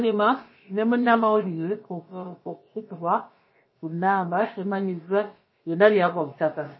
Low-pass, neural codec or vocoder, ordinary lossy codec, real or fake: 7.2 kHz; codec, 16 kHz, about 1 kbps, DyCAST, with the encoder's durations; MP3, 24 kbps; fake